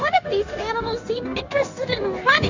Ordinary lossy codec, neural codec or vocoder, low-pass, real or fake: AAC, 48 kbps; codec, 16 kHz, 0.9 kbps, LongCat-Audio-Codec; 7.2 kHz; fake